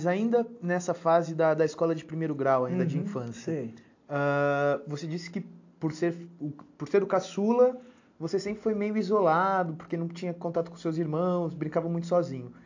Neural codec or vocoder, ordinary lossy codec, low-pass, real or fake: none; AAC, 48 kbps; 7.2 kHz; real